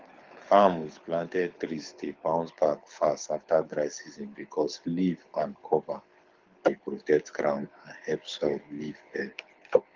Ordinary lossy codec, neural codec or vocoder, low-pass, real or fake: Opus, 32 kbps; codec, 24 kHz, 6 kbps, HILCodec; 7.2 kHz; fake